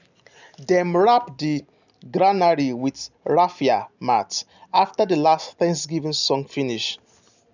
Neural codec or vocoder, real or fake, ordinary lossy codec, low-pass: none; real; none; 7.2 kHz